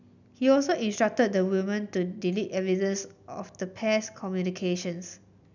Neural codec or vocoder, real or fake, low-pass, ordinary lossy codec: none; real; 7.2 kHz; none